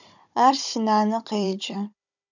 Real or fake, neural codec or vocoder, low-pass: fake; codec, 16 kHz, 16 kbps, FunCodec, trained on Chinese and English, 50 frames a second; 7.2 kHz